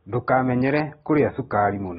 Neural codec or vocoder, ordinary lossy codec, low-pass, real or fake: none; AAC, 16 kbps; 19.8 kHz; real